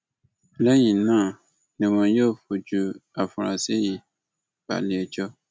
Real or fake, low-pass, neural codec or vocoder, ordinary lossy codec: real; none; none; none